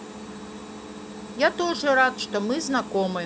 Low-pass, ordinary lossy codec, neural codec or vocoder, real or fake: none; none; none; real